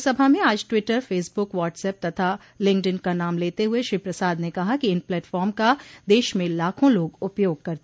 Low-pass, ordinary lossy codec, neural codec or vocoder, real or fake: none; none; none; real